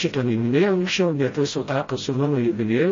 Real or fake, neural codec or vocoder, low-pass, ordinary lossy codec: fake; codec, 16 kHz, 0.5 kbps, FreqCodec, smaller model; 7.2 kHz; MP3, 32 kbps